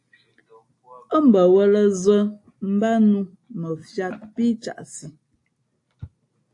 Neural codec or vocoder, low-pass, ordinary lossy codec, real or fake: none; 10.8 kHz; AAC, 48 kbps; real